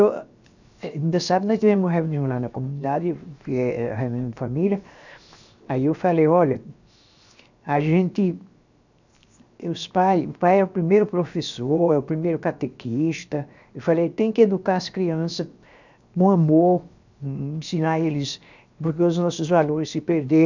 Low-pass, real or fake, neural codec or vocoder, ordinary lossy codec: 7.2 kHz; fake; codec, 16 kHz, 0.7 kbps, FocalCodec; none